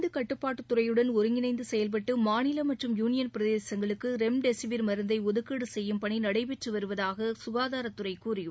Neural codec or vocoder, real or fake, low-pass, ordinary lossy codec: none; real; none; none